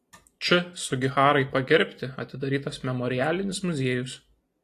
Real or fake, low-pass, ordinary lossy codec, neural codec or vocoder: real; 14.4 kHz; AAC, 48 kbps; none